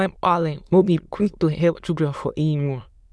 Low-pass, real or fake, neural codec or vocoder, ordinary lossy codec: none; fake; autoencoder, 22.05 kHz, a latent of 192 numbers a frame, VITS, trained on many speakers; none